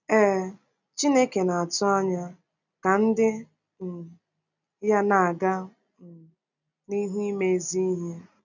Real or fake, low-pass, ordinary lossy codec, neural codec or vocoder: real; 7.2 kHz; none; none